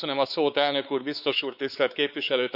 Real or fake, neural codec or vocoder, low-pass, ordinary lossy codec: fake; codec, 16 kHz, 4 kbps, X-Codec, WavLM features, trained on Multilingual LibriSpeech; 5.4 kHz; none